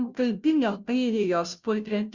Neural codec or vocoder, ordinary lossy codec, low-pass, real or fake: codec, 16 kHz, 0.5 kbps, FunCodec, trained on Chinese and English, 25 frames a second; Opus, 64 kbps; 7.2 kHz; fake